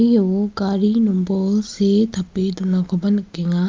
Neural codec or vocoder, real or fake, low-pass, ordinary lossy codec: none; real; 7.2 kHz; Opus, 24 kbps